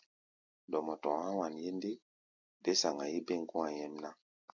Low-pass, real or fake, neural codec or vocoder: 7.2 kHz; real; none